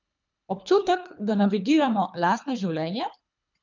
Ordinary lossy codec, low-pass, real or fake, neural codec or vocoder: none; 7.2 kHz; fake; codec, 24 kHz, 3 kbps, HILCodec